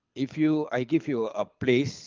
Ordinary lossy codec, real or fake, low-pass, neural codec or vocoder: Opus, 24 kbps; fake; 7.2 kHz; codec, 24 kHz, 6 kbps, HILCodec